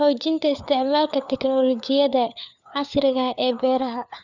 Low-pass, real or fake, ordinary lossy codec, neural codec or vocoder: 7.2 kHz; fake; none; codec, 16 kHz, 4 kbps, FunCodec, trained on LibriTTS, 50 frames a second